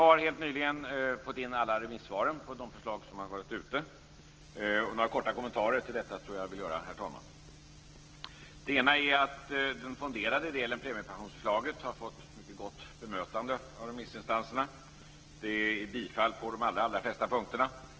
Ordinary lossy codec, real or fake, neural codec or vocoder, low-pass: Opus, 16 kbps; real; none; 7.2 kHz